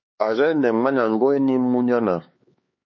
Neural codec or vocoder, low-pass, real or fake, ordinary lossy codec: codec, 16 kHz, 4 kbps, X-Codec, HuBERT features, trained on LibriSpeech; 7.2 kHz; fake; MP3, 32 kbps